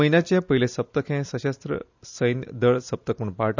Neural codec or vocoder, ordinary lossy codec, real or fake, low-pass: none; none; real; 7.2 kHz